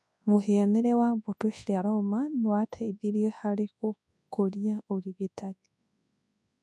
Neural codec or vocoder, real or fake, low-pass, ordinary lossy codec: codec, 24 kHz, 0.9 kbps, WavTokenizer, large speech release; fake; none; none